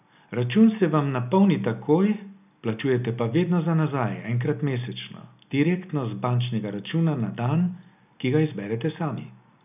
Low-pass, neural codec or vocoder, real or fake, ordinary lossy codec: 3.6 kHz; none; real; none